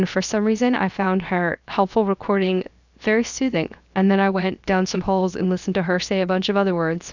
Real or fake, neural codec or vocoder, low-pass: fake; codec, 16 kHz, 0.7 kbps, FocalCodec; 7.2 kHz